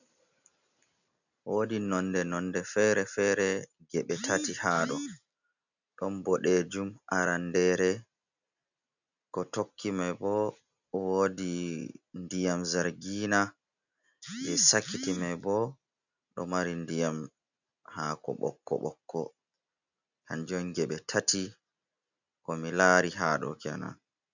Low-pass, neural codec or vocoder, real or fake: 7.2 kHz; none; real